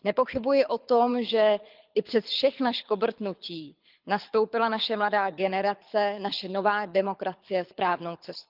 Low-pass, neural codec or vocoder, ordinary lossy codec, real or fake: 5.4 kHz; codec, 24 kHz, 6 kbps, HILCodec; Opus, 24 kbps; fake